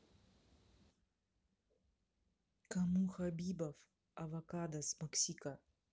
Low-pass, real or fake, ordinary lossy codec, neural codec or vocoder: none; real; none; none